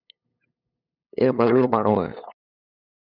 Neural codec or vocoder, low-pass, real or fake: codec, 16 kHz, 8 kbps, FunCodec, trained on LibriTTS, 25 frames a second; 5.4 kHz; fake